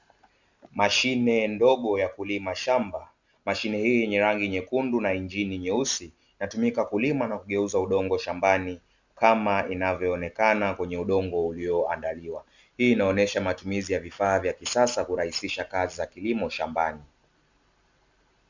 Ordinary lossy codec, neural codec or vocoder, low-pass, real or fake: Opus, 64 kbps; none; 7.2 kHz; real